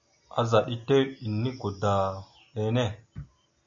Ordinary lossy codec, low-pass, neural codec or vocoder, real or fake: MP3, 64 kbps; 7.2 kHz; none; real